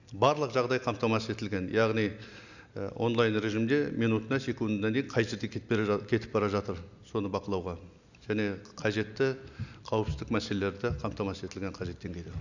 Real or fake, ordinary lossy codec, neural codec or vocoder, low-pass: real; none; none; 7.2 kHz